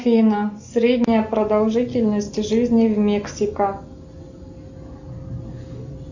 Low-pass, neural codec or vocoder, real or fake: 7.2 kHz; none; real